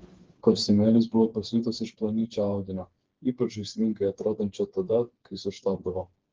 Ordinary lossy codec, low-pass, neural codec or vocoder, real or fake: Opus, 16 kbps; 7.2 kHz; codec, 16 kHz, 4 kbps, FreqCodec, smaller model; fake